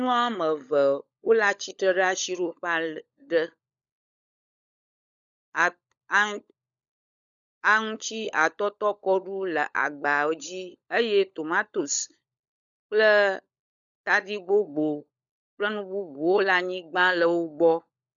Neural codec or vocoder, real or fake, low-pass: codec, 16 kHz, 16 kbps, FunCodec, trained on LibriTTS, 50 frames a second; fake; 7.2 kHz